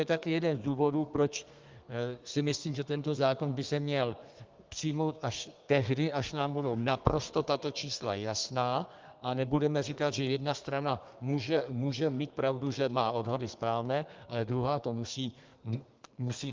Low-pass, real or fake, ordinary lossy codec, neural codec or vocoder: 7.2 kHz; fake; Opus, 32 kbps; codec, 32 kHz, 1.9 kbps, SNAC